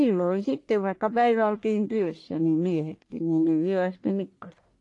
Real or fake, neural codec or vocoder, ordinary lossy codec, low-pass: fake; codec, 44.1 kHz, 1.7 kbps, Pupu-Codec; AAC, 48 kbps; 10.8 kHz